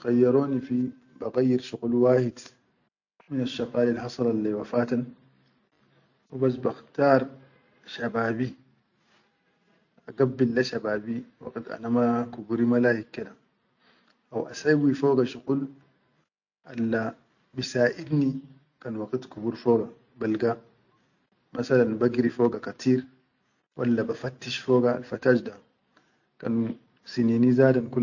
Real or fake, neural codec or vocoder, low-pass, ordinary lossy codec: real; none; 7.2 kHz; none